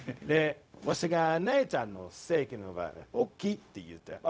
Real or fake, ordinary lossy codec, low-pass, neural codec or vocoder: fake; none; none; codec, 16 kHz, 0.4 kbps, LongCat-Audio-Codec